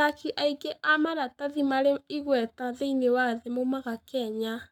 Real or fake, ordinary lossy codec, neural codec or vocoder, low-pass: fake; none; codec, 44.1 kHz, 7.8 kbps, Pupu-Codec; 19.8 kHz